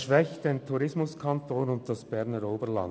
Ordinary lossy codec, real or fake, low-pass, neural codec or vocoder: none; real; none; none